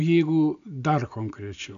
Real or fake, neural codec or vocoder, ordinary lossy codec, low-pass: real; none; AAC, 64 kbps; 7.2 kHz